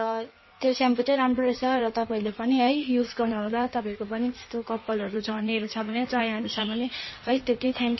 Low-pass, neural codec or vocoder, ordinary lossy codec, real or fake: 7.2 kHz; codec, 16 kHz in and 24 kHz out, 1.1 kbps, FireRedTTS-2 codec; MP3, 24 kbps; fake